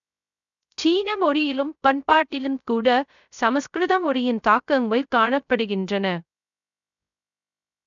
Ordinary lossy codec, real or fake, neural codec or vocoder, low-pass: none; fake; codec, 16 kHz, 0.3 kbps, FocalCodec; 7.2 kHz